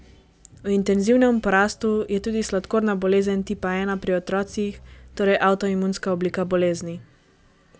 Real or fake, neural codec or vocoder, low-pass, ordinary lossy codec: real; none; none; none